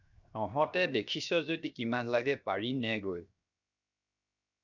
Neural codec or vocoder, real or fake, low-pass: codec, 16 kHz, 0.7 kbps, FocalCodec; fake; 7.2 kHz